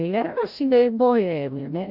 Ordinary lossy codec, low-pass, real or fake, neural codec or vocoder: none; 5.4 kHz; fake; codec, 16 kHz, 0.5 kbps, FreqCodec, larger model